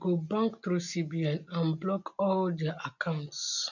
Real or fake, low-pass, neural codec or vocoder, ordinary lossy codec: real; 7.2 kHz; none; none